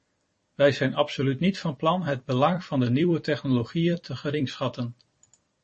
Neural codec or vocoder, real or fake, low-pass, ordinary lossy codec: vocoder, 44.1 kHz, 128 mel bands every 256 samples, BigVGAN v2; fake; 10.8 kHz; MP3, 32 kbps